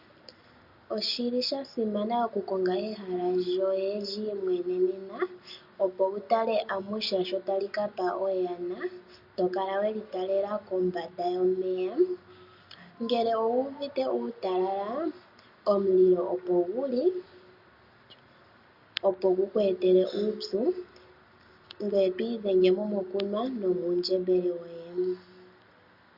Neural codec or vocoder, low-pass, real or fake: none; 5.4 kHz; real